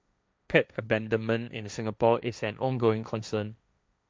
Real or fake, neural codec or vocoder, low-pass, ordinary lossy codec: fake; codec, 16 kHz, 1.1 kbps, Voila-Tokenizer; none; none